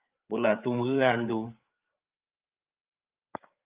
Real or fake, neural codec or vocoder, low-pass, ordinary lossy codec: fake; codec, 16 kHz, 8 kbps, FreqCodec, larger model; 3.6 kHz; Opus, 32 kbps